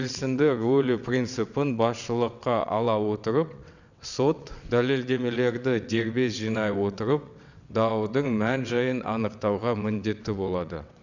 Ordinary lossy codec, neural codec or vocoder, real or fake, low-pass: none; vocoder, 22.05 kHz, 80 mel bands, WaveNeXt; fake; 7.2 kHz